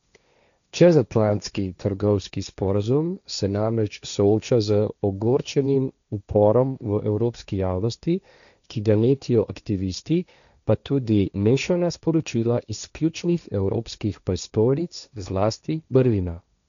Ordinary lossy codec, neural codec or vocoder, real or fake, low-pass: none; codec, 16 kHz, 1.1 kbps, Voila-Tokenizer; fake; 7.2 kHz